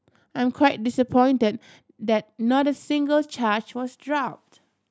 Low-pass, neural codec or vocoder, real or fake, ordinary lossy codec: none; none; real; none